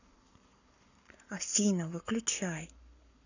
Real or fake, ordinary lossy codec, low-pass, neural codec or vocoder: fake; none; 7.2 kHz; codec, 44.1 kHz, 7.8 kbps, Pupu-Codec